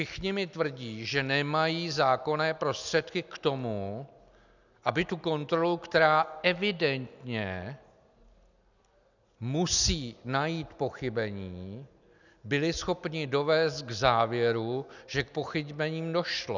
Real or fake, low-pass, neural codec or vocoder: real; 7.2 kHz; none